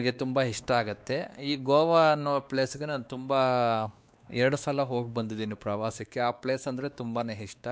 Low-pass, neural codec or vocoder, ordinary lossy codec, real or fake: none; codec, 16 kHz, 2 kbps, X-Codec, WavLM features, trained on Multilingual LibriSpeech; none; fake